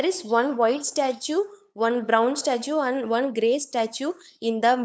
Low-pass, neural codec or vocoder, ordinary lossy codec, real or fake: none; codec, 16 kHz, 8 kbps, FunCodec, trained on LibriTTS, 25 frames a second; none; fake